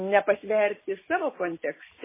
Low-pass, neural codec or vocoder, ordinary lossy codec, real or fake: 3.6 kHz; none; MP3, 16 kbps; real